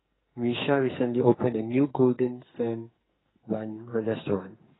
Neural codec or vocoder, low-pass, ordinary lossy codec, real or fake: codec, 44.1 kHz, 2.6 kbps, SNAC; 7.2 kHz; AAC, 16 kbps; fake